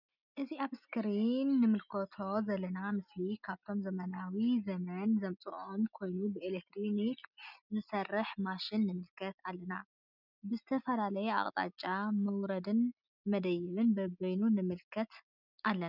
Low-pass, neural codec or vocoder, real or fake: 5.4 kHz; none; real